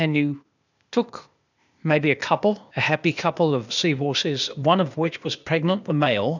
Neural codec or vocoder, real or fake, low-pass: codec, 16 kHz, 0.8 kbps, ZipCodec; fake; 7.2 kHz